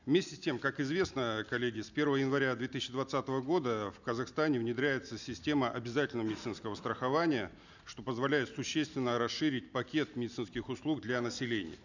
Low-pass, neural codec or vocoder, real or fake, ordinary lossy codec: 7.2 kHz; none; real; none